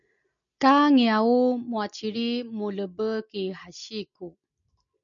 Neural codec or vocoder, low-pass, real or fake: none; 7.2 kHz; real